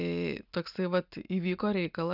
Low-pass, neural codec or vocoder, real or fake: 5.4 kHz; none; real